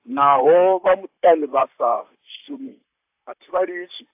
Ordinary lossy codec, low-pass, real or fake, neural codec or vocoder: AAC, 32 kbps; 3.6 kHz; fake; vocoder, 44.1 kHz, 128 mel bands, Pupu-Vocoder